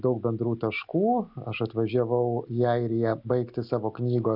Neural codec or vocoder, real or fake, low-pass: none; real; 5.4 kHz